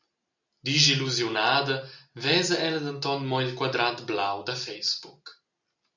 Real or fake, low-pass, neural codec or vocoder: real; 7.2 kHz; none